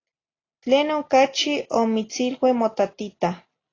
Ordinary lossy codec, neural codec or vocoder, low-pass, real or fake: AAC, 32 kbps; none; 7.2 kHz; real